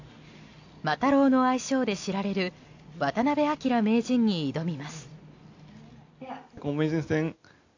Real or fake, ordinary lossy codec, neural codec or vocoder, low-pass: real; AAC, 48 kbps; none; 7.2 kHz